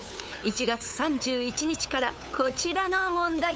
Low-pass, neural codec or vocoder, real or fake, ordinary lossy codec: none; codec, 16 kHz, 8 kbps, FunCodec, trained on LibriTTS, 25 frames a second; fake; none